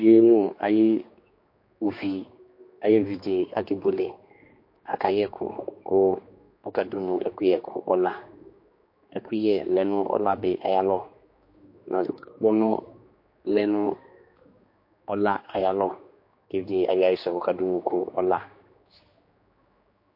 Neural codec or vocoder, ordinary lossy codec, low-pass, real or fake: codec, 16 kHz, 2 kbps, X-Codec, HuBERT features, trained on general audio; MP3, 32 kbps; 5.4 kHz; fake